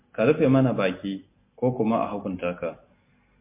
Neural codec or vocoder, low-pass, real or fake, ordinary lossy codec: none; 3.6 kHz; real; MP3, 24 kbps